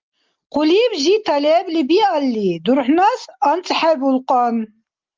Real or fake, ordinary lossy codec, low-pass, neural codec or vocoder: real; Opus, 32 kbps; 7.2 kHz; none